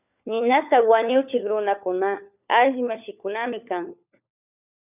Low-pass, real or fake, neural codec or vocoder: 3.6 kHz; fake; codec, 16 kHz, 4 kbps, FunCodec, trained on LibriTTS, 50 frames a second